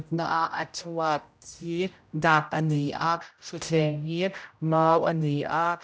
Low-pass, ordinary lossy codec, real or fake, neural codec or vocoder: none; none; fake; codec, 16 kHz, 0.5 kbps, X-Codec, HuBERT features, trained on general audio